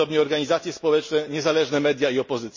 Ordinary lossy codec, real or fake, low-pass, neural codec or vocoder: MP3, 32 kbps; real; 7.2 kHz; none